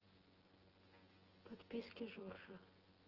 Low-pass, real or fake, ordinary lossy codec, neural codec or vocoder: 5.4 kHz; fake; Opus, 16 kbps; vocoder, 24 kHz, 100 mel bands, Vocos